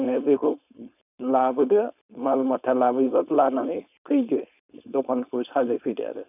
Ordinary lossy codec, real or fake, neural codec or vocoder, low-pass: none; fake; codec, 16 kHz, 4.8 kbps, FACodec; 3.6 kHz